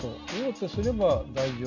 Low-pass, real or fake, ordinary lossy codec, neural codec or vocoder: 7.2 kHz; real; none; none